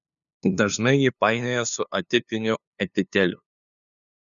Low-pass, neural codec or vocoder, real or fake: 7.2 kHz; codec, 16 kHz, 2 kbps, FunCodec, trained on LibriTTS, 25 frames a second; fake